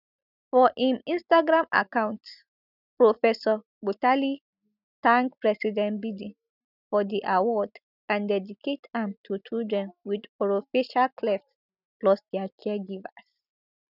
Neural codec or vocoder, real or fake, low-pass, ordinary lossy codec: none; real; 5.4 kHz; none